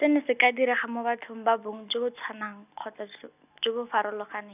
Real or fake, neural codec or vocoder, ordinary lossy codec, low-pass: real; none; none; 3.6 kHz